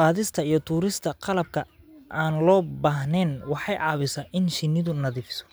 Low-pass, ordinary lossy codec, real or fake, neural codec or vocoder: none; none; real; none